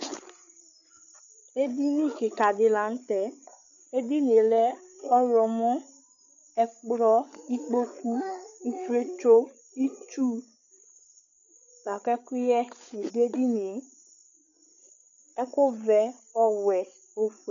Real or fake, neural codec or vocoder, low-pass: fake; codec, 16 kHz, 8 kbps, FreqCodec, larger model; 7.2 kHz